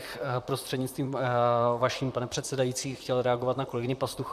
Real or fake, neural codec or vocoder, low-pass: fake; vocoder, 44.1 kHz, 128 mel bands, Pupu-Vocoder; 14.4 kHz